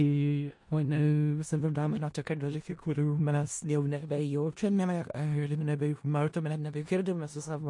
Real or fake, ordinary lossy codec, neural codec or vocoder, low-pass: fake; MP3, 48 kbps; codec, 16 kHz in and 24 kHz out, 0.4 kbps, LongCat-Audio-Codec, four codebook decoder; 10.8 kHz